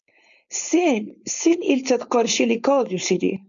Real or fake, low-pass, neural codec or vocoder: fake; 7.2 kHz; codec, 16 kHz, 4.8 kbps, FACodec